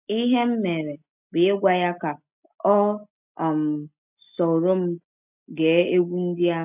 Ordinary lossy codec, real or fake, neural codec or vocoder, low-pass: none; real; none; 3.6 kHz